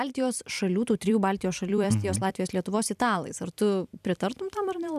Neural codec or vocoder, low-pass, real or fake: vocoder, 44.1 kHz, 128 mel bands every 256 samples, BigVGAN v2; 14.4 kHz; fake